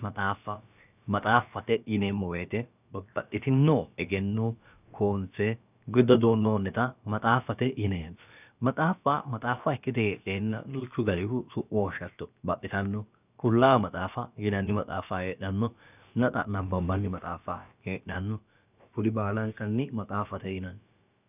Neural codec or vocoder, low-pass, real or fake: codec, 16 kHz, about 1 kbps, DyCAST, with the encoder's durations; 3.6 kHz; fake